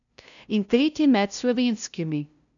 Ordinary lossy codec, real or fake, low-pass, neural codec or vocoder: MP3, 96 kbps; fake; 7.2 kHz; codec, 16 kHz, 0.5 kbps, FunCodec, trained on LibriTTS, 25 frames a second